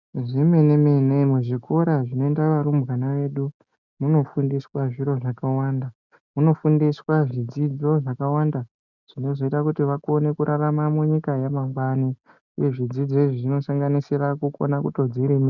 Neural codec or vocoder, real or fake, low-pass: none; real; 7.2 kHz